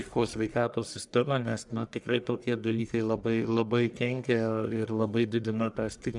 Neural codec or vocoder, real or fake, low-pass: codec, 44.1 kHz, 1.7 kbps, Pupu-Codec; fake; 10.8 kHz